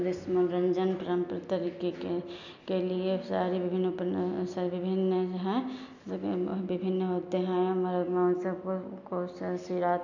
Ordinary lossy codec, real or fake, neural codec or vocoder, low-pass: none; real; none; 7.2 kHz